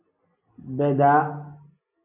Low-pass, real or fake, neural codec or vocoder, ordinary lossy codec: 3.6 kHz; real; none; MP3, 32 kbps